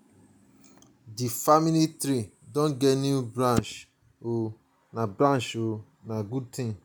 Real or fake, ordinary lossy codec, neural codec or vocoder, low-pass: real; none; none; none